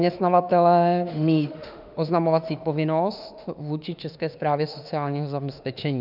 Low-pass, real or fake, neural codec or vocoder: 5.4 kHz; fake; autoencoder, 48 kHz, 32 numbers a frame, DAC-VAE, trained on Japanese speech